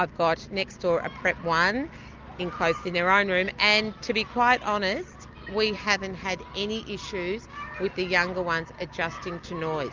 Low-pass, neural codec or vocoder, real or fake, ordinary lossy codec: 7.2 kHz; none; real; Opus, 24 kbps